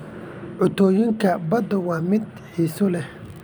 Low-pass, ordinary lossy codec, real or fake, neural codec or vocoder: none; none; fake; vocoder, 44.1 kHz, 128 mel bands every 256 samples, BigVGAN v2